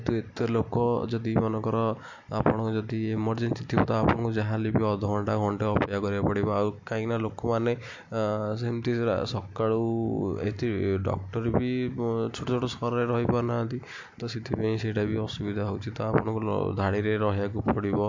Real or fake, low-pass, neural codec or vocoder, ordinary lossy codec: real; 7.2 kHz; none; MP3, 48 kbps